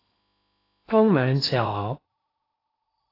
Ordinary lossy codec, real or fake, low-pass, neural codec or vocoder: AAC, 32 kbps; fake; 5.4 kHz; codec, 16 kHz in and 24 kHz out, 0.8 kbps, FocalCodec, streaming, 65536 codes